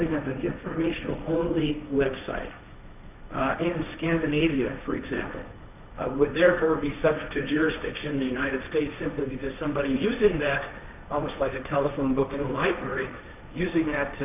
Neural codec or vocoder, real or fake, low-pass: codec, 16 kHz, 1.1 kbps, Voila-Tokenizer; fake; 3.6 kHz